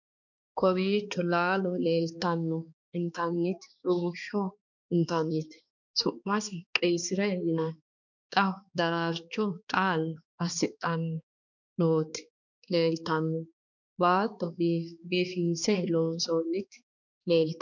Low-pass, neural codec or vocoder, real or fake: 7.2 kHz; codec, 16 kHz, 2 kbps, X-Codec, HuBERT features, trained on balanced general audio; fake